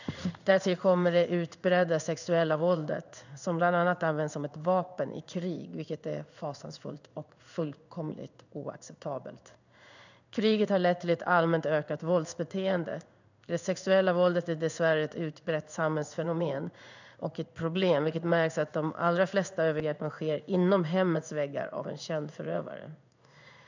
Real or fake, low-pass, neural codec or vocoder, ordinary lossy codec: fake; 7.2 kHz; codec, 16 kHz in and 24 kHz out, 1 kbps, XY-Tokenizer; none